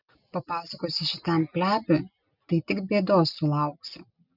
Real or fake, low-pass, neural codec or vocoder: real; 5.4 kHz; none